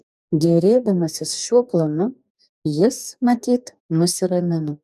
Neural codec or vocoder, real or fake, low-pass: codec, 44.1 kHz, 2.6 kbps, DAC; fake; 14.4 kHz